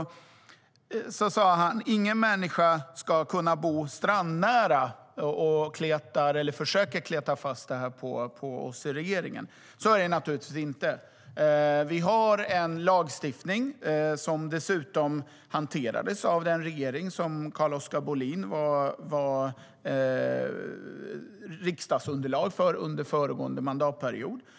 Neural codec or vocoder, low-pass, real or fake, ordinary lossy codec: none; none; real; none